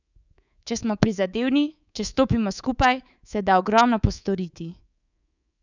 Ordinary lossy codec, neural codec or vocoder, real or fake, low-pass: none; codec, 24 kHz, 3.1 kbps, DualCodec; fake; 7.2 kHz